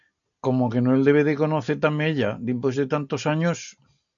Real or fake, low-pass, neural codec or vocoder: real; 7.2 kHz; none